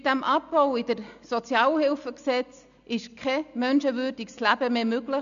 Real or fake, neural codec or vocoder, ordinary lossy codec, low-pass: real; none; none; 7.2 kHz